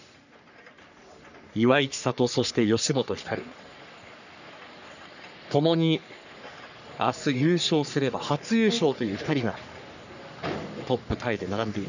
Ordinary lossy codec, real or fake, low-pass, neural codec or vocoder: none; fake; 7.2 kHz; codec, 44.1 kHz, 3.4 kbps, Pupu-Codec